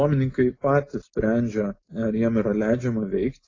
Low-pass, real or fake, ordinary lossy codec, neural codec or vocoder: 7.2 kHz; real; AAC, 32 kbps; none